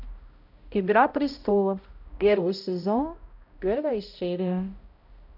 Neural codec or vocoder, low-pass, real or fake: codec, 16 kHz, 0.5 kbps, X-Codec, HuBERT features, trained on balanced general audio; 5.4 kHz; fake